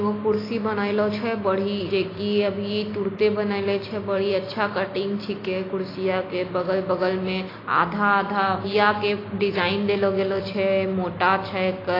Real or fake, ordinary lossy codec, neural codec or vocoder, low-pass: real; AAC, 24 kbps; none; 5.4 kHz